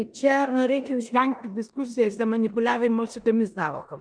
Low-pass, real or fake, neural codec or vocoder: 9.9 kHz; fake; codec, 16 kHz in and 24 kHz out, 0.9 kbps, LongCat-Audio-Codec, four codebook decoder